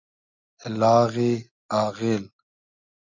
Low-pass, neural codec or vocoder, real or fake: 7.2 kHz; none; real